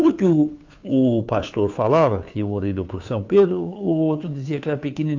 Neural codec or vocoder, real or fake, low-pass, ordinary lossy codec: codec, 16 kHz, 2 kbps, FunCodec, trained on Chinese and English, 25 frames a second; fake; 7.2 kHz; AAC, 48 kbps